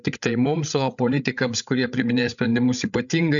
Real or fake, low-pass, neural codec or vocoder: fake; 7.2 kHz; codec, 16 kHz, 8 kbps, FreqCodec, larger model